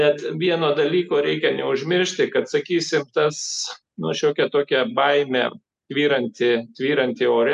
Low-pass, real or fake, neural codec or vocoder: 10.8 kHz; real; none